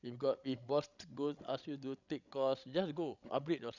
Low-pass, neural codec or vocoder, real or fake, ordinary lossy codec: 7.2 kHz; codec, 16 kHz, 16 kbps, FreqCodec, larger model; fake; none